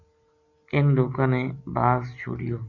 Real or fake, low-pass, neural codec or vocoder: real; 7.2 kHz; none